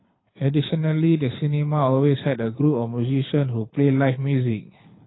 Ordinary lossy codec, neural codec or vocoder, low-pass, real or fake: AAC, 16 kbps; codec, 24 kHz, 6 kbps, HILCodec; 7.2 kHz; fake